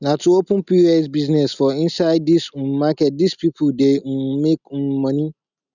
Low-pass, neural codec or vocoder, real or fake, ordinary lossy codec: 7.2 kHz; none; real; none